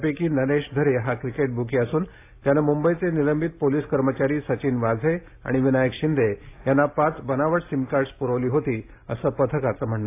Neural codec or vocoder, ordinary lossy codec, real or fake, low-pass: none; AAC, 24 kbps; real; 3.6 kHz